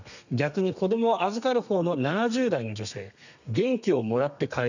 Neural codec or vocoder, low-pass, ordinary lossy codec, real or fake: codec, 32 kHz, 1.9 kbps, SNAC; 7.2 kHz; none; fake